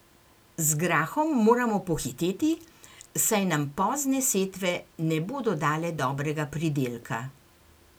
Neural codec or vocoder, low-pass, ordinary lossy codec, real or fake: none; none; none; real